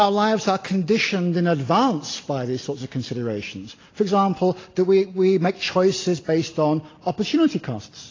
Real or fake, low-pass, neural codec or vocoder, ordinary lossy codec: real; 7.2 kHz; none; AAC, 32 kbps